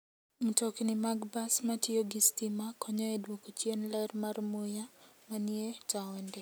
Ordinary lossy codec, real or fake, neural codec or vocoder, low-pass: none; real; none; none